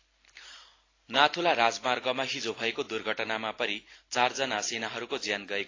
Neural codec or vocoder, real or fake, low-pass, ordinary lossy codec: none; real; 7.2 kHz; AAC, 32 kbps